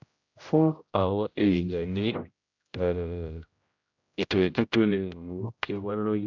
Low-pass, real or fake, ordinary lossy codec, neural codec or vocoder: 7.2 kHz; fake; AAC, 48 kbps; codec, 16 kHz, 0.5 kbps, X-Codec, HuBERT features, trained on general audio